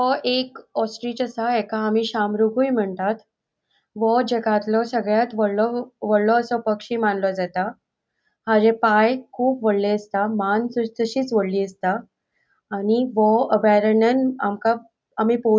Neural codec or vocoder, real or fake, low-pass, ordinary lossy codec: none; real; none; none